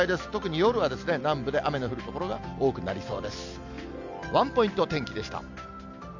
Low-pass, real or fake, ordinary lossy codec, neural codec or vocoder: 7.2 kHz; real; none; none